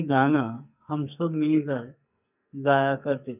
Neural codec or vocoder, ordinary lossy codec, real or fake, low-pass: codec, 44.1 kHz, 2.6 kbps, SNAC; none; fake; 3.6 kHz